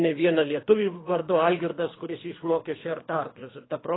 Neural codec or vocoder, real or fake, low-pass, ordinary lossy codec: codec, 24 kHz, 3 kbps, HILCodec; fake; 7.2 kHz; AAC, 16 kbps